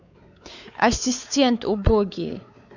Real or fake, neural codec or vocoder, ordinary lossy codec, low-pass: fake; codec, 16 kHz, 4 kbps, X-Codec, WavLM features, trained on Multilingual LibriSpeech; none; 7.2 kHz